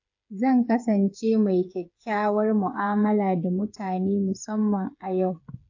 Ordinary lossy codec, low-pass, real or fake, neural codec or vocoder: AAC, 48 kbps; 7.2 kHz; fake; codec, 16 kHz, 8 kbps, FreqCodec, smaller model